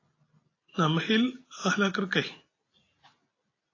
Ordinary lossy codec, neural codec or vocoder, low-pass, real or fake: AAC, 32 kbps; none; 7.2 kHz; real